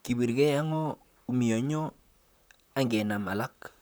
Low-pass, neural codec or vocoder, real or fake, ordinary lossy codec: none; vocoder, 44.1 kHz, 128 mel bands, Pupu-Vocoder; fake; none